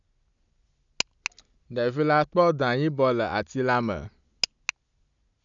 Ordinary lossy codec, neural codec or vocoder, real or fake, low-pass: none; none; real; 7.2 kHz